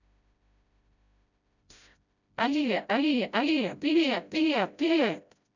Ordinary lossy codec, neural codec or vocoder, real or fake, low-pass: none; codec, 16 kHz, 0.5 kbps, FreqCodec, smaller model; fake; 7.2 kHz